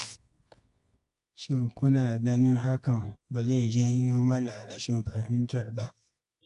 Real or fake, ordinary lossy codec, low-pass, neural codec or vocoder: fake; MP3, 64 kbps; 10.8 kHz; codec, 24 kHz, 0.9 kbps, WavTokenizer, medium music audio release